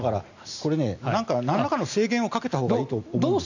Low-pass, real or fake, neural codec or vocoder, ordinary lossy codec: 7.2 kHz; real; none; none